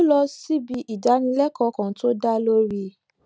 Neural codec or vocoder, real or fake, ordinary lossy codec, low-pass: none; real; none; none